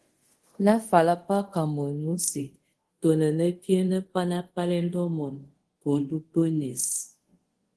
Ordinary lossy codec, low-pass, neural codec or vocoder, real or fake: Opus, 16 kbps; 10.8 kHz; codec, 24 kHz, 0.5 kbps, DualCodec; fake